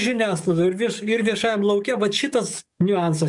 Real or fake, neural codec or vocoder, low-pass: fake; codec, 44.1 kHz, 7.8 kbps, DAC; 10.8 kHz